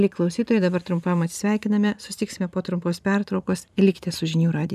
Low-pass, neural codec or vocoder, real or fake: 14.4 kHz; vocoder, 44.1 kHz, 128 mel bands every 512 samples, BigVGAN v2; fake